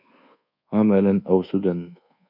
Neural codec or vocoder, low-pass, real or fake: codec, 24 kHz, 1.2 kbps, DualCodec; 5.4 kHz; fake